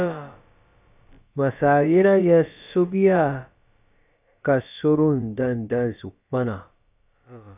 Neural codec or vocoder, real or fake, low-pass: codec, 16 kHz, about 1 kbps, DyCAST, with the encoder's durations; fake; 3.6 kHz